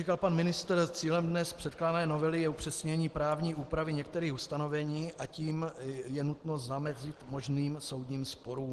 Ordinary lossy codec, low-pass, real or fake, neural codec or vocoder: Opus, 16 kbps; 10.8 kHz; real; none